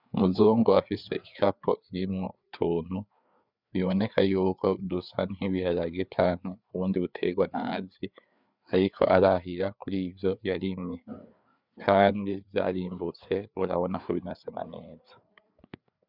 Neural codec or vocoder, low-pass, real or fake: codec, 16 kHz, 4 kbps, FreqCodec, larger model; 5.4 kHz; fake